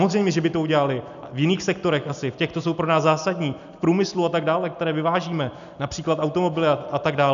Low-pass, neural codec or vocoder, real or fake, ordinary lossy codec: 7.2 kHz; none; real; MP3, 96 kbps